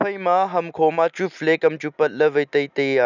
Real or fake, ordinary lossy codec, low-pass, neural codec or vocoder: real; none; 7.2 kHz; none